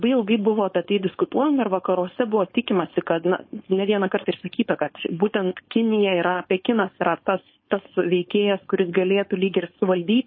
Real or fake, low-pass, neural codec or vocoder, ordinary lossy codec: fake; 7.2 kHz; codec, 16 kHz, 4.8 kbps, FACodec; MP3, 24 kbps